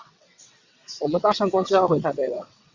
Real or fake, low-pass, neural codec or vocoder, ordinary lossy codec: fake; 7.2 kHz; vocoder, 22.05 kHz, 80 mel bands, WaveNeXt; Opus, 64 kbps